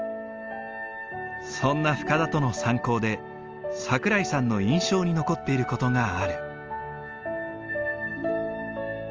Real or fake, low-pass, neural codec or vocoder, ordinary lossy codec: real; 7.2 kHz; none; Opus, 24 kbps